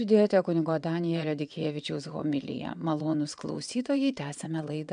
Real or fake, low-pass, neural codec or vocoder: fake; 9.9 kHz; vocoder, 22.05 kHz, 80 mel bands, WaveNeXt